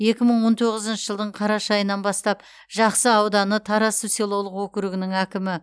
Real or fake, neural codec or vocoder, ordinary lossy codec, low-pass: real; none; none; none